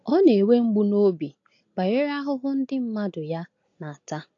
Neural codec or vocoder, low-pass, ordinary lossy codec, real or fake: none; 7.2 kHz; AAC, 48 kbps; real